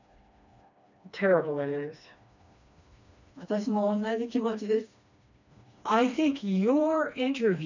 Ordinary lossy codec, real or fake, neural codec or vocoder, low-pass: none; fake; codec, 16 kHz, 2 kbps, FreqCodec, smaller model; 7.2 kHz